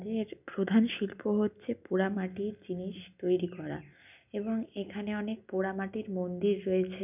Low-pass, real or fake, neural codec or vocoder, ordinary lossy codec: 3.6 kHz; real; none; none